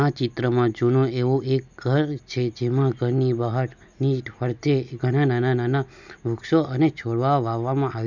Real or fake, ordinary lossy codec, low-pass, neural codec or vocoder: real; none; 7.2 kHz; none